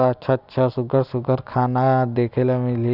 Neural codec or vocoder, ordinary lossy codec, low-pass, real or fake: none; none; 5.4 kHz; real